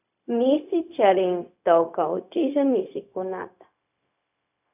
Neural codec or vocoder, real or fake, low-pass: codec, 16 kHz, 0.4 kbps, LongCat-Audio-Codec; fake; 3.6 kHz